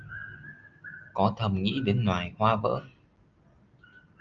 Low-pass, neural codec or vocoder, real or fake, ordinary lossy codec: 7.2 kHz; none; real; Opus, 24 kbps